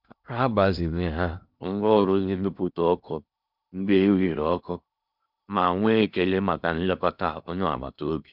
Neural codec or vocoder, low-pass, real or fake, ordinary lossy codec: codec, 16 kHz in and 24 kHz out, 0.8 kbps, FocalCodec, streaming, 65536 codes; 5.4 kHz; fake; none